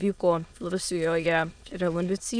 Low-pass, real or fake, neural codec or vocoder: 9.9 kHz; fake; autoencoder, 22.05 kHz, a latent of 192 numbers a frame, VITS, trained on many speakers